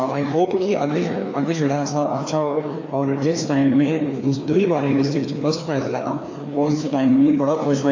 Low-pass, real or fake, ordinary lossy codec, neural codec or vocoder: 7.2 kHz; fake; AAC, 48 kbps; codec, 16 kHz, 2 kbps, FreqCodec, larger model